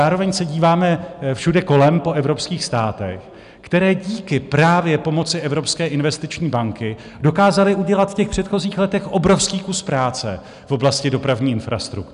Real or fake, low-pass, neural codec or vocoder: real; 10.8 kHz; none